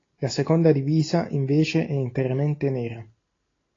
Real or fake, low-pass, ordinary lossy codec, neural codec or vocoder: real; 7.2 kHz; AAC, 32 kbps; none